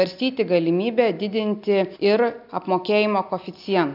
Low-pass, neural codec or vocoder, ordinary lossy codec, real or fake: 5.4 kHz; none; AAC, 48 kbps; real